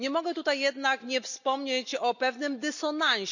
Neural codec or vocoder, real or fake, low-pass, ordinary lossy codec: none; real; 7.2 kHz; none